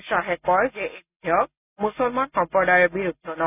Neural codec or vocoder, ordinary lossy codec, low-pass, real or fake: none; none; 3.6 kHz; real